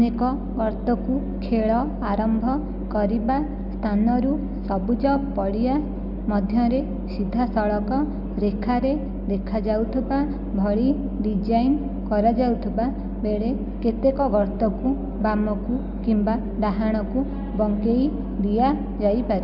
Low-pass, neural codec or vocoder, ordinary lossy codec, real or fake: 5.4 kHz; none; none; real